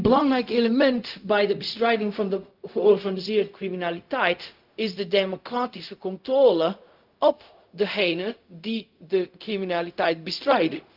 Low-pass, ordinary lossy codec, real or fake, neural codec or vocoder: 5.4 kHz; Opus, 32 kbps; fake; codec, 16 kHz, 0.4 kbps, LongCat-Audio-Codec